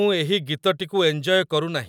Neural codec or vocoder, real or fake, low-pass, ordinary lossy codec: none; real; 19.8 kHz; none